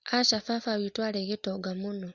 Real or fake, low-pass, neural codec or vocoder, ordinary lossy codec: real; 7.2 kHz; none; Opus, 64 kbps